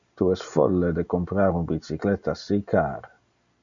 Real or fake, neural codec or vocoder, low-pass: real; none; 7.2 kHz